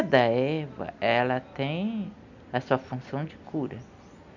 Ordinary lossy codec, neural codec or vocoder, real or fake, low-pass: none; none; real; 7.2 kHz